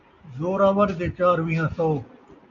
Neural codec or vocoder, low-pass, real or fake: none; 7.2 kHz; real